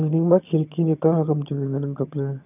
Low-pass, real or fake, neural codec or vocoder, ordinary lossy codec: 3.6 kHz; fake; vocoder, 22.05 kHz, 80 mel bands, HiFi-GAN; none